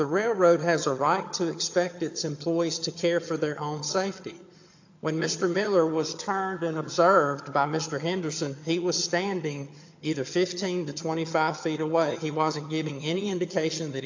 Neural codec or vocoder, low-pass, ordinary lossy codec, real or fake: vocoder, 22.05 kHz, 80 mel bands, HiFi-GAN; 7.2 kHz; AAC, 48 kbps; fake